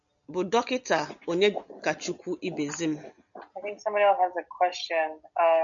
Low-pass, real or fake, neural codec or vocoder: 7.2 kHz; real; none